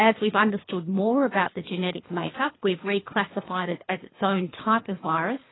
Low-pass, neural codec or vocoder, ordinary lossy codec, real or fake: 7.2 kHz; codec, 24 kHz, 3 kbps, HILCodec; AAC, 16 kbps; fake